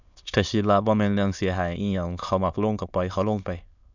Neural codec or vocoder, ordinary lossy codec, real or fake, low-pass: autoencoder, 22.05 kHz, a latent of 192 numbers a frame, VITS, trained on many speakers; none; fake; 7.2 kHz